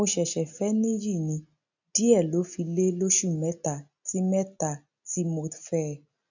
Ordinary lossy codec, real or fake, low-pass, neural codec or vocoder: none; real; 7.2 kHz; none